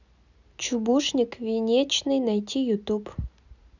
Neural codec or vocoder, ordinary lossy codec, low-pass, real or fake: none; none; 7.2 kHz; real